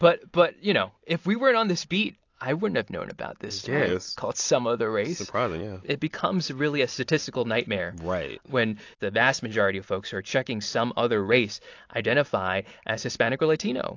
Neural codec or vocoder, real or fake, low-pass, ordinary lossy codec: none; real; 7.2 kHz; AAC, 48 kbps